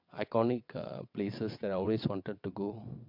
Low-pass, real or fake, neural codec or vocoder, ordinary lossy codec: 5.4 kHz; fake; vocoder, 22.05 kHz, 80 mel bands, WaveNeXt; MP3, 48 kbps